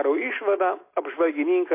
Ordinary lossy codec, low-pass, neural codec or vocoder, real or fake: MP3, 24 kbps; 3.6 kHz; none; real